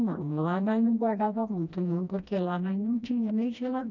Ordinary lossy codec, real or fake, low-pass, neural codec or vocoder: none; fake; 7.2 kHz; codec, 16 kHz, 1 kbps, FreqCodec, smaller model